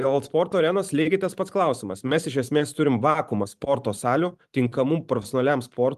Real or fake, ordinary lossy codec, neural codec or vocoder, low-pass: fake; Opus, 32 kbps; vocoder, 44.1 kHz, 128 mel bands every 512 samples, BigVGAN v2; 14.4 kHz